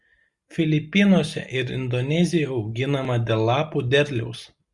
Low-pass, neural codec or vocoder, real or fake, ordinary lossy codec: 10.8 kHz; none; real; Opus, 64 kbps